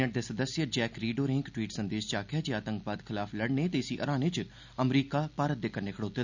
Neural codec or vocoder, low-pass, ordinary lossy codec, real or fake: none; 7.2 kHz; none; real